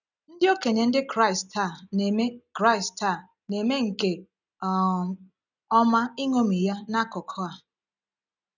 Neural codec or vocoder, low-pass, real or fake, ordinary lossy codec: none; 7.2 kHz; real; none